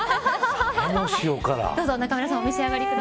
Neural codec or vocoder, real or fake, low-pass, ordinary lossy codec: none; real; none; none